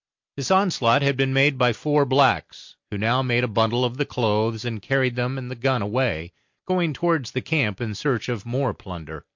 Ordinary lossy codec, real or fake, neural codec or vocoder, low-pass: MP3, 48 kbps; real; none; 7.2 kHz